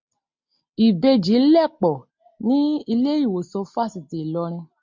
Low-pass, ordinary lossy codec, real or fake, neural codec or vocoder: 7.2 kHz; MP3, 48 kbps; real; none